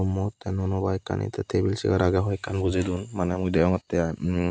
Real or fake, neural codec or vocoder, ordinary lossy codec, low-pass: real; none; none; none